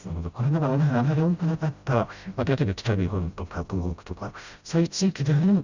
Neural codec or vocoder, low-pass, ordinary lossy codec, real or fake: codec, 16 kHz, 0.5 kbps, FreqCodec, smaller model; 7.2 kHz; Opus, 64 kbps; fake